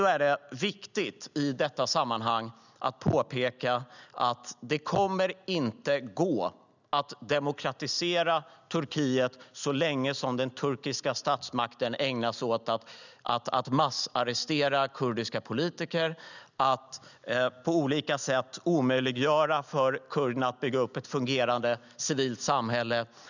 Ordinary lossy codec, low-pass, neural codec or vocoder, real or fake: none; 7.2 kHz; none; real